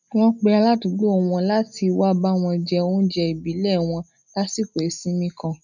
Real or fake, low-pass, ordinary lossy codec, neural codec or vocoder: real; 7.2 kHz; none; none